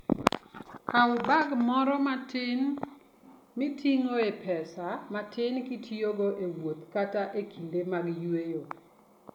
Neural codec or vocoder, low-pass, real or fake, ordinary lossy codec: none; 19.8 kHz; real; none